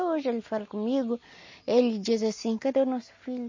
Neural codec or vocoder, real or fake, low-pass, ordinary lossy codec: none; real; 7.2 kHz; MP3, 32 kbps